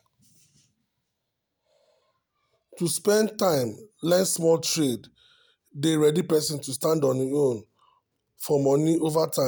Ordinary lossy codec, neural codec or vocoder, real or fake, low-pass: none; none; real; none